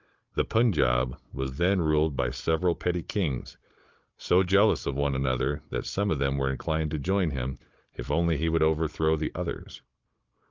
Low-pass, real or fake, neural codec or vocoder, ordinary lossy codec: 7.2 kHz; fake; codec, 16 kHz, 16 kbps, FunCodec, trained on Chinese and English, 50 frames a second; Opus, 32 kbps